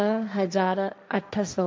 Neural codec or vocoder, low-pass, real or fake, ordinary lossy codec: codec, 16 kHz, 1.1 kbps, Voila-Tokenizer; none; fake; none